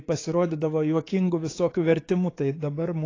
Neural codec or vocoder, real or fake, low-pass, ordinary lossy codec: codec, 16 kHz, 6 kbps, DAC; fake; 7.2 kHz; AAC, 32 kbps